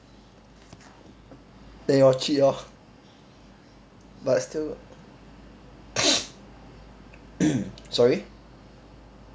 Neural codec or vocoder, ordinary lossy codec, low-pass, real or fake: none; none; none; real